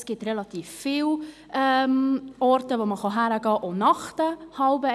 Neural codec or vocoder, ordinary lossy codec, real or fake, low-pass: none; none; real; none